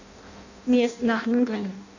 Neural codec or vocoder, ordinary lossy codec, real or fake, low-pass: codec, 16 kHz in and 24 kHz out, 0.6 kbps, FireRedTTS-2 codec; none; fake; 7.2 kHz